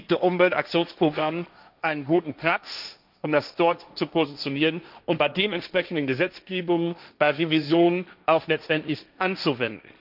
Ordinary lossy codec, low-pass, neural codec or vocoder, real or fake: none; 5.4 kHz; codec, 16 kHz, 1.1 kbps, Voila-Tokenizer; fake